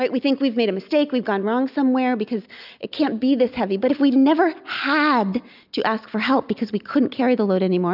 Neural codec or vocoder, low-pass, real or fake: none; 5.4 kHz; real